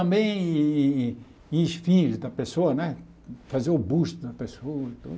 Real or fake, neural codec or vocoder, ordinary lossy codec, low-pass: real; none; none; none